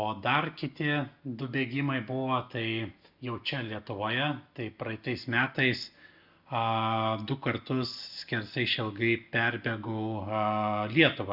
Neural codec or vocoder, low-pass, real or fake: none; 5.4 kHz; real